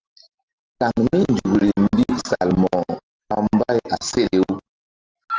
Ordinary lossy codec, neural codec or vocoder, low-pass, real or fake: Opus, 16 kbps; none; 7.2 kHz; real